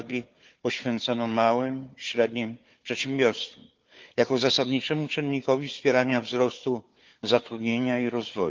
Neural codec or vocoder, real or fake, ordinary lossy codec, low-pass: codec, 16 kHz, 4 kbps, FunCodec, trained on Chinese and English, 50 frames a second; fake; Opus, 32 kbps; 7.2 kHz